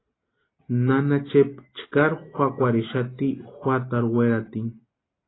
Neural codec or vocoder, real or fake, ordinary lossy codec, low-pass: none; real; AAC, 16 kbps; 7.2 kHz